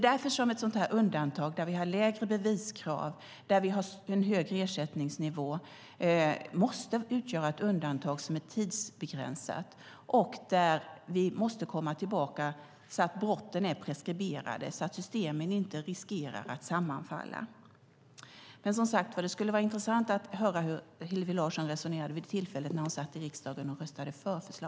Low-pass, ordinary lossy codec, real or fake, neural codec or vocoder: none; none; real; none